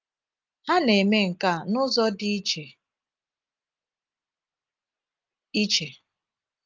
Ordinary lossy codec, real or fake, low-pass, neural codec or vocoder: Opus, 24 kbps; real; 7.2 kHz; none